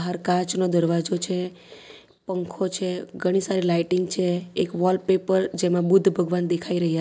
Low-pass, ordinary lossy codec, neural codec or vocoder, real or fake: none; none; none; real